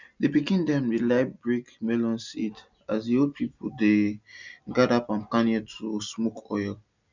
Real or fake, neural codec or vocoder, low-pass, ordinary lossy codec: real; none; 7.2 kHz; none